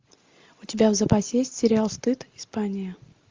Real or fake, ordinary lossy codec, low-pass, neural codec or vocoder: real; Opus, 32 kbps; 7.2 kHz; none